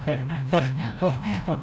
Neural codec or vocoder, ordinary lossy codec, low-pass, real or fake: codec, 16 kHz, 0.5 kbps, FreqCodec, larger model; none; none; fake